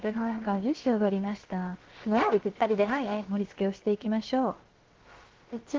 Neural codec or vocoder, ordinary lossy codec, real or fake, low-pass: codec, 16 kHz, about 1 kbps, DyCAST, with the encoder's durations; Opus, 16 kbps; fake; 7.2 kHz